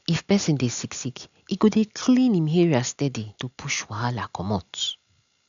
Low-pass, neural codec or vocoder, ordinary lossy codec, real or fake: 7.2 kHz; none; none; real